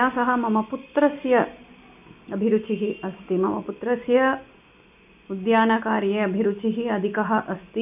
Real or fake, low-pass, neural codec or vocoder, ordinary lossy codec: real; 3.6 kHz; none; none